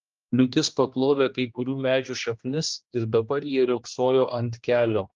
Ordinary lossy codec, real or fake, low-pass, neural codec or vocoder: Opus, 24 kbps; fake; 7.2 kHz; codec, 16 kHz, 1 kbps, X-Codec, HuBERT features, trained on general audio